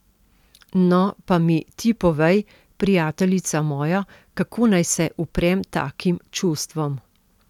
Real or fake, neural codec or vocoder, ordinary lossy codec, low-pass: real; none; none; 19.8 kHz